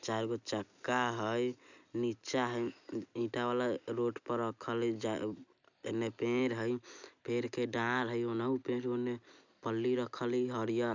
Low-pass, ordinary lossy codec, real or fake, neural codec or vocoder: 7.2 kHz; none; real; none